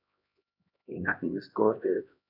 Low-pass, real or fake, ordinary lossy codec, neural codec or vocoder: 5.4 kHz; fake; none; codec, 16 kHz, 1 kbps, X-Codec, HuBERT features, trained on LibriSpeech